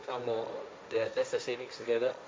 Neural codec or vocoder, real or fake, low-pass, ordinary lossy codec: codec, 16 kHz, 1.1 kbps, Voila-Tokenizer; fake; none; none